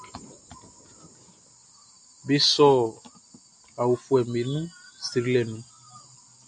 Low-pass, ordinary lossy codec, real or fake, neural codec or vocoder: 9.9 kHz; AAC, 64 kbps; real; none